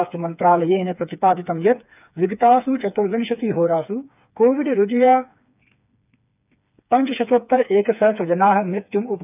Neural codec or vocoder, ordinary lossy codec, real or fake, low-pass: codec, 16 kHz, 4 kbps, FreqCodec, smaller model; none; fake; 3.6 kHz